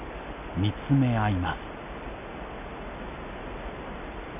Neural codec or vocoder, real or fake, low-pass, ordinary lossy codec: none; real; 3.6 kHz; none